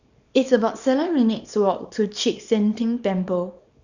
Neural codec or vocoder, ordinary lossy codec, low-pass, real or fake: codec, 24 kHz, 0.9 kbps, WavTokenizer, small release; none; 7.2 kHz; fake